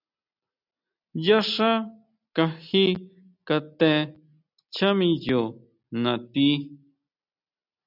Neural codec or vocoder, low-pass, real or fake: none; 5.4 kHz; real